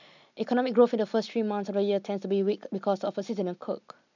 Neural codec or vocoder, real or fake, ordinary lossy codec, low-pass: none; real; none; 7.2 kHz